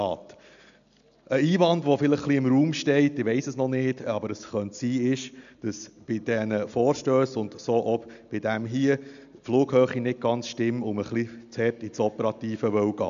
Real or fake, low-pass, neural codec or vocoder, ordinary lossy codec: real; 7.2 kHz; none; MP3, 96 kbps